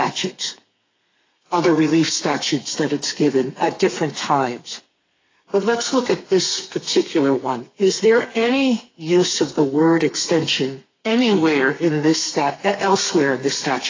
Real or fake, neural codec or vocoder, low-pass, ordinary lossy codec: fake; codec, 32 kHz, 1.9 kbps, SNAC; 7.2 kHz; AAC, 32 kbps